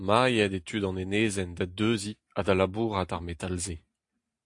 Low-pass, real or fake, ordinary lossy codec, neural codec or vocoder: 10.8 kHz; real; AAC, 64 kbps; none